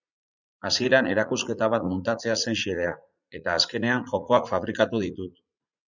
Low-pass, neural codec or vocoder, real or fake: 7.2 kHz; vocoder, 44.1 kHz, 80 mel bands, Vocos; fake